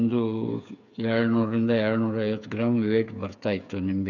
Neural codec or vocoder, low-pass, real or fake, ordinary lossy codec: codec, 16 kHz, 8 kbps, FreqCodec, smaller model; 7.2 kHz; fake; none